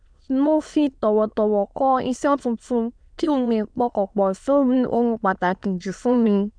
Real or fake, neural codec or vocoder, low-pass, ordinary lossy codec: fake; autoencoder, 22.05 kHz, a latent of 192 numbers a frame, VITS, trained on many speakers; 9.9 kHz; none